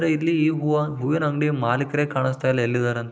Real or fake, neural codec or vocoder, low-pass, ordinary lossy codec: real; none; none; none